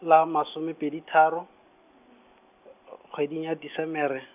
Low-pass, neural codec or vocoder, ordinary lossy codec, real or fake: 3.6 kHz; none; none; real